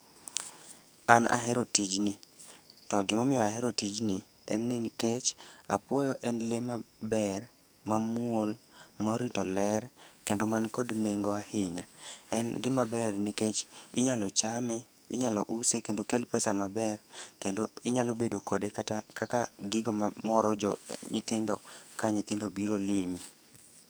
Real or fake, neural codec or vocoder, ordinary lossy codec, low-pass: fake; codec, 44.1 kHz, 2.6 kbps, SNAC; none; none